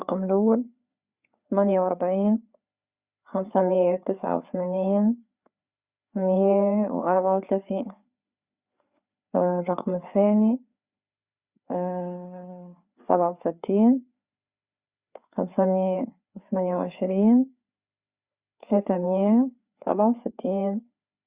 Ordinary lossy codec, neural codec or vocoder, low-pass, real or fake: Opus, 64 kbps; codec, 16 kHz, 4 kbps, FreqCodec, larger model; 3.6 kHz; fake